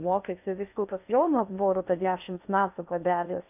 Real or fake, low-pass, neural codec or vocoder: fake; 3.6 kHz; codec, 16 kHz in and 24 kHz out, 0.6 kbps, FocalCodec, streaming, 2048 codes